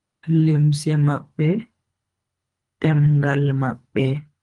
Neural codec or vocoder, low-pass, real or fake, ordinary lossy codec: codec, 24 kHz, 3 kbps, HILCodec; 10.8 kHz; fake; Opus, 32 kbps